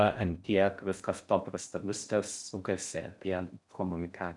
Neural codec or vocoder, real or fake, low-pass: codec, 16 kHz in and 24 kHz out, 0.6 kbps, FocalCodec, streaming, 4096 codes; fake; 10.8 kHz